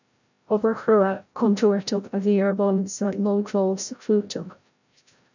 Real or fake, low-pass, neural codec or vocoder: fake; 7.2 kHz; codec, 16 kHz, 0.5 kbps, FreqCodec, larger model